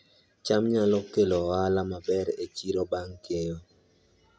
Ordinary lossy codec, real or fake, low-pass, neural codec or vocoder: none; real; none; none